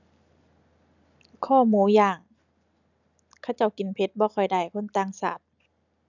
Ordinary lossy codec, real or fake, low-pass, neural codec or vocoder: none; real; 7.2 kHz; none